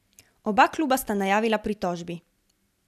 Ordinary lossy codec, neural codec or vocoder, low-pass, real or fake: none; none; 14.4 kHz; real